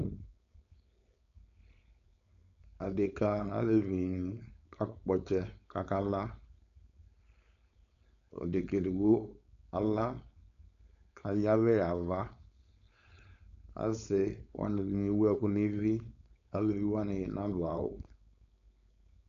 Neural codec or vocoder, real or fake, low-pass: codec, 16 kHz, 4.8 kbps, FACodec; fake; 7.2 kHz